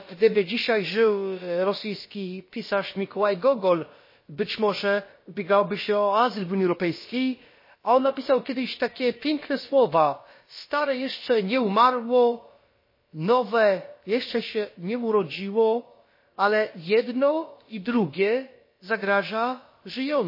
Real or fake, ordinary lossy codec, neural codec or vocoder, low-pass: fake; MP3, 24 kbps; codec, 16 kHz, about 1 kbps, DyCAST, with the encoder's durations; 5.4 kHz